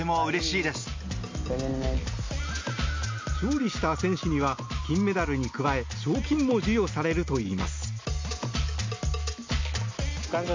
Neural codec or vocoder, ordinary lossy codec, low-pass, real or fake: none; MP3, 48 kbps; 7.2 kHz; real